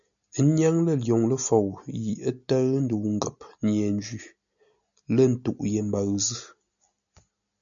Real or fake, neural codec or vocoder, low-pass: real; none; 7.2 kHz